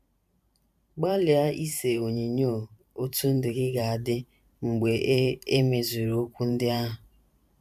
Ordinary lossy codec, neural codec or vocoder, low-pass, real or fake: none; vocoder, 44.1 kHz, 128 mel bands every 512 samples, BigVGAN v2; 14.4 kHz; fake